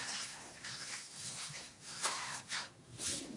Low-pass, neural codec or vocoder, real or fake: 10.8 kHz; codec, 24 kHz, 1 kbps, SNAC; fake